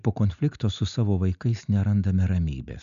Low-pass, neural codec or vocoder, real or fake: 7.2 kHz; none; real